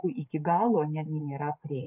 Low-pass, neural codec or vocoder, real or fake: 3.6 kHz; vocoder, 44.1 kHz, 80 mel bands, Vocos; fake